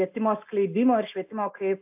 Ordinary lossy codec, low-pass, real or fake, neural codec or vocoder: AAC, 32 kbps; 3.6 kHz; real; none